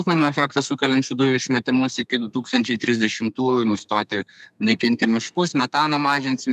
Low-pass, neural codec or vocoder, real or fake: 14.4 kHz; codec, 32 kHz, 1.9 kbps, SNAC; fake